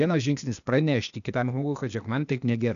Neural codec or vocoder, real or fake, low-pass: codec, 16 kHz, 0.8 kbps, ZipCodec; fake; 7.2 kHz